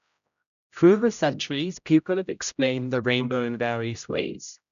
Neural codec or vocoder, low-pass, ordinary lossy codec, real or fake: codec, 16 kHz, 0.5 kbps, X-Codec, HuBERT features, trained on general audio; 7.2 kHz; none; fake